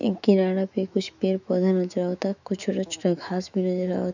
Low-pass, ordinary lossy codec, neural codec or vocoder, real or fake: 7.2 kHz; none; autoencoder, 48 kHz, 128 numbers a frame, DAC-VAE, trained on Japanese speech; fake